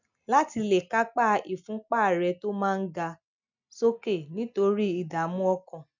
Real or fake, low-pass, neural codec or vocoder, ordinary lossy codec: real; 7.2 kHz; none; none